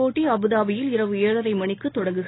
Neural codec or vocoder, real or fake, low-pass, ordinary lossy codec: none; real; 7.2 kHz; AAC, 16 kbps